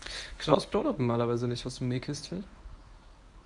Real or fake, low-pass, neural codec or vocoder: fake; 10.8 kHz; codec, 24 kHz, 0.9 kbps, WavTokenizer, medium speech release version 1